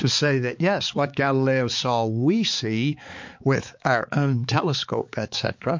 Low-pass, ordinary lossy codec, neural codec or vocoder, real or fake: 7.2 kHz; MP3, 48 kbps; codec, 16 kHz, 4 kbps, X-Codec, HuBERT features, trained on balanced general audio; fake